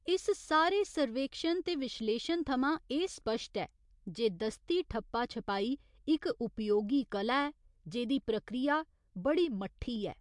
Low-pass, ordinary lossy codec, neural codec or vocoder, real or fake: 10.8 kHz; MP3, 64 kbps; none; real